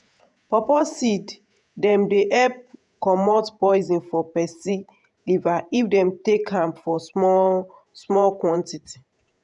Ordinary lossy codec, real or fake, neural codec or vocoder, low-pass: none; fake; vocoder, 48 kHz, 128 mel bands, Vocos; 10.8 kHz